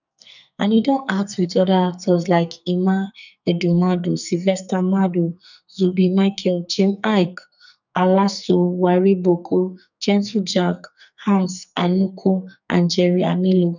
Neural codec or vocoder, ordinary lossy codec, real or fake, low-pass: codec, 44.1 kHz, 2.6 kbps, SNAC; none; fake; 7.2 kHz